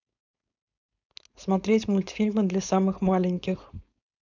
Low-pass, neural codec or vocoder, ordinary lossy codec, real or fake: 7.2 kHz; codec, 16 kHz, 4.8 kbps, FACodec; none; fake